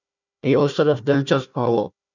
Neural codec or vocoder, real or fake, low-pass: codec, 16 kHz, 1 kbps, FunCodec, trained on Chinese and English, 50 frames a second; fake; 7.2 kHz